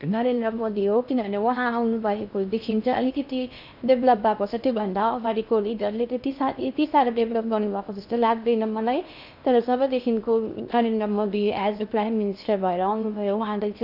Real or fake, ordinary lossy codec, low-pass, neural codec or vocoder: fake; none; 5.4 kHz; codec, 16 kHz in and 24 kHz out, 0.6 kbps, FocalCodec, streaming, 2048 codes